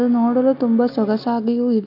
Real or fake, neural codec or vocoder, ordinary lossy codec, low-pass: real; none; none; 5.4 kHz